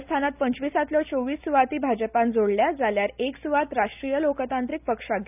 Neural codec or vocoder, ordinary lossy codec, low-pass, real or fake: none; none; 3.6 kHz; real